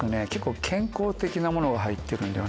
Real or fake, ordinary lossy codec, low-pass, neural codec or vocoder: real; none; none; none